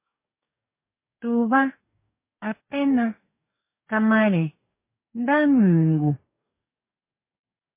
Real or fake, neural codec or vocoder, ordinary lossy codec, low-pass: fake; codec, 44.1 kHz, 2.6 kbps, DAC; MP3, 24 kbps; 3.6 kHz